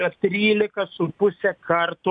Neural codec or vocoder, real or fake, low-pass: none; real; 9.9 kHz